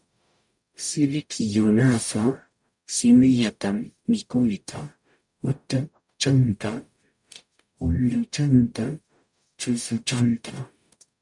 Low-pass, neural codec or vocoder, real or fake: 10.8 kHz; codec, 44.1 kHz, 0.9 kbps, DAC; fake